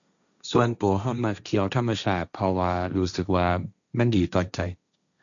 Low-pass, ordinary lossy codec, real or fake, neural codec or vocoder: 7.2 kHz; none; fake; codec, 16 kHz, 1.1 kbps, Voila-Tokenizer